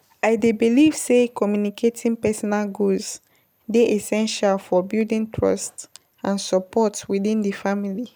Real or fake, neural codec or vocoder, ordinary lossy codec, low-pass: real; none; none; none